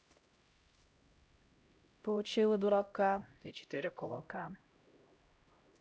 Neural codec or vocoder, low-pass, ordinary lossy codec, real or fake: codec, 16 kHz, 0.5 kbps, X-Codec, HuBERT features, trained on LibriSpeech; none; none; fake